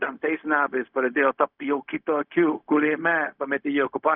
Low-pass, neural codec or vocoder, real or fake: 5.4 kHz; codec, 16 kHz, 0.4 kbps, LongCat-Audio-Codec; fake